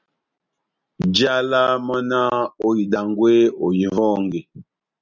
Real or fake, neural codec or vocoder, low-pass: real; none; 7.2 kHz